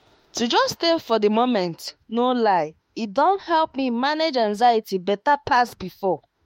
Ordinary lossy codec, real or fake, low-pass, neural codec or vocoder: MP3, 64 kbps; fake; 19.8 kHz; autoencoder, 48 kHz, 32 numbers a frame, DAC-VAE, trained on Japanese speech